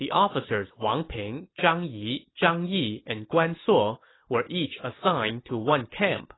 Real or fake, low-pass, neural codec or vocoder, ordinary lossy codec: real; 7.2 kHz; none; AAC, 16 kbps